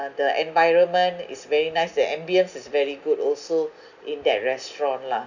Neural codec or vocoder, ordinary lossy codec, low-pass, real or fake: none; none; 7.2 kHz; real